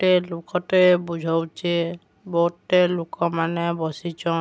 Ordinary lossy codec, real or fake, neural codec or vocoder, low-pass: none; real; none; none